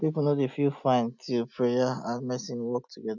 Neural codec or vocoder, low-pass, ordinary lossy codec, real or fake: none; 7.2 kHz; none; real